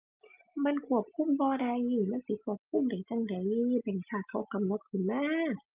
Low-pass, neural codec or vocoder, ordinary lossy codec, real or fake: 3.6 kHz; none; Opus, 32 kbps; real